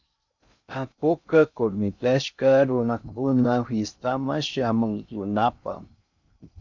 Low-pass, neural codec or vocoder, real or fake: 7.2 kHz; codec, 16 kHz in and 24 kHz out, 0.6 kbps, FocalCodec, streaming, 2048 codes; fake